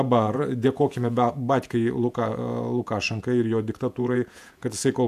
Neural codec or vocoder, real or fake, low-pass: vocoder, 48 kHz, 128 mel bands, Vocos; fake; 14.4 kHz